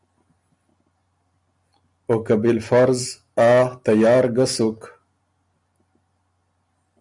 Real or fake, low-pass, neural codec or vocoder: real; 10.8 kHz; none